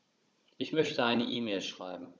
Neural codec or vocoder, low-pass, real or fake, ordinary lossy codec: codec, 16 kHz, 16 kbps, FunCodec, trained on Chinese and English, 50 frames a second; none; fake; none